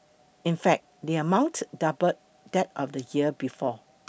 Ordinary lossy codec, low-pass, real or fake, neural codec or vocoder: none; none; real; none